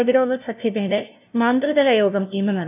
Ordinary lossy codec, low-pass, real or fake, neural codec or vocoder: none; 3.6 kHz; fake; codec, 16 kHz, 0.5 kbps, FunCodec, trained on LibriTTS, 25 frames a second